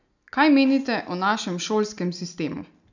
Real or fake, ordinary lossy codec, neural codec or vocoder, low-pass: real; none; none; 7.2 kHz